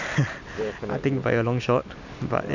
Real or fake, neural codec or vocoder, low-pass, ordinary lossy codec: real; none; 7.2 kHz; none